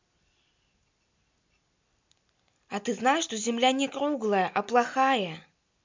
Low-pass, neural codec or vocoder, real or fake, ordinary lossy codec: 7.2 kHz; none; real; MP3, 64 kbps